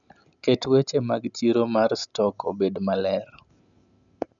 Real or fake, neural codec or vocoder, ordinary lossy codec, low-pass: real; none; none; 7.2 kHz